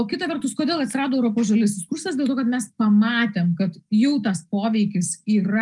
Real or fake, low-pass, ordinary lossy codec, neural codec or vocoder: real; 10.8 kHz; Opus, 32 kbps; none